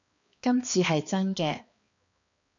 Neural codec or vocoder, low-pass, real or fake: codec, 16 kHz, 2 kbps, X-Codec, HuBERT features, trained on balanced general audio; 7.2 kHz; fake